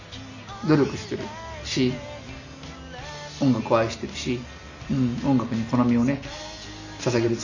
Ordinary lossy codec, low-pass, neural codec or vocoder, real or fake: none; 7.2 kHz; none; real